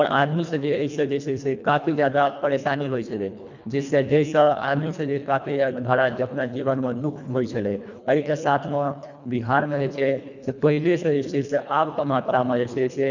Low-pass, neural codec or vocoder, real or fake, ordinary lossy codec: 7.2 kHz; codec, 24 kHz, 1.5 kbps, HILCodec; fake; none